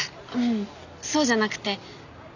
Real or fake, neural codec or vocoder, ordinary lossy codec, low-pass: real; none; none; 7.2 kHz